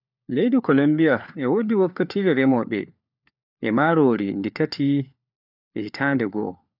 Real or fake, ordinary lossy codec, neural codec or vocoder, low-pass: fake; none; codec, 16 kHz, 4 kbps, FunCodec, trained on LibriTTS, 50 frames a second; 5.4 kHz